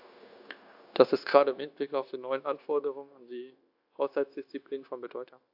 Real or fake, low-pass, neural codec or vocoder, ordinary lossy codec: fake; 5.4 kHz; codec, 16 kHz, 0.9 kbps, LongCat-Audio-Codec; AAC, 48 kbps